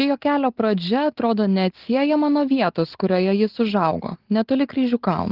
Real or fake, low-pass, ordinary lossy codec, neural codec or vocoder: real; 5.4 kHz; Opus, 16 kbps; none